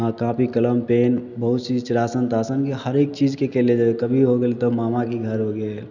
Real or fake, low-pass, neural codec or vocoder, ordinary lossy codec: real; 7.2 kHz; none; none